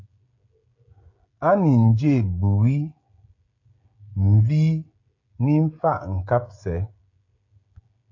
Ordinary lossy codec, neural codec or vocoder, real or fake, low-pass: AAC, 48 kbps; codec, 16 kHz, 16 kbps, FreqCodec, smaller model; fake; 7.2 kHz